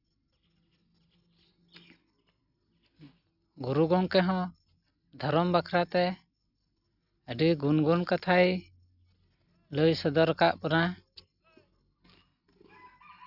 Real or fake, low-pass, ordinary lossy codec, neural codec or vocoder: real; 5.4 kHz; none; none